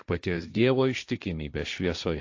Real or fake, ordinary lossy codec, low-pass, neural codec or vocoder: fake; AAC, 48 kbps; 7.2 kHz; codec, 16 kHz, 1.1 kbps, Voila-Tokenizer